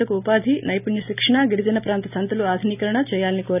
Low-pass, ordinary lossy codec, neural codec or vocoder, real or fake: 3.6 kHz; none; none; real